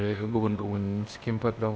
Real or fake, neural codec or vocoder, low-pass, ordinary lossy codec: fake; codec, 16 kHz, 0.8 kbps, ZipCodec; none; none